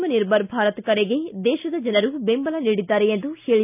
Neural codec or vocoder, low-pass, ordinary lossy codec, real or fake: none; 3.6 kHz; none; real